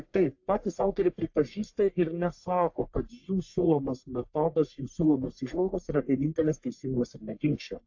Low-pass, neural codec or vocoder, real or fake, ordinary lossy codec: 7.2 kHz; codec, 44.1 kHz, 1.7 kbps, Pupu-Codec; fake; AAC, 48 kbps